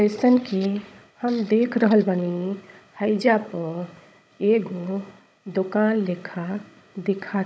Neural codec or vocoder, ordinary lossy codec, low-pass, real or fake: codec, 16 kHz, 16 kbps, FunCodec, trained on Chinese and English, 50 frames a second; none; none; fake